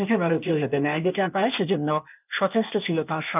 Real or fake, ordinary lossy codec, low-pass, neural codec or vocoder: fake; none; 3.6 kHz; codec, 16 kHz, 0.8 kbps, ZipCodec